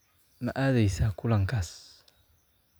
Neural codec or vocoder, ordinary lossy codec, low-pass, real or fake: none; none; none; real